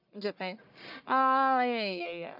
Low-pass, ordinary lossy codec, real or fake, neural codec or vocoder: 5.4 kHz; none; fake; codec, 44.1 kHz, 1.7 kbps, Pupu-Codec